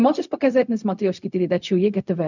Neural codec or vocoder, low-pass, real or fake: codec, 16 kHz, 0.4 kbps, LongCat-Audio-Codec; 7.2 kHz; fake